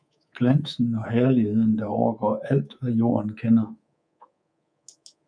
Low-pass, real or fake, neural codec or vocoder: 9.9 kHz; fake; codec, 24 kHz, 3.1 kbps, DualCodec